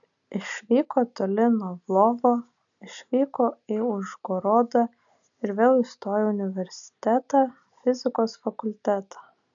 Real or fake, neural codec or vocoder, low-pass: real; none; 7.2 kHz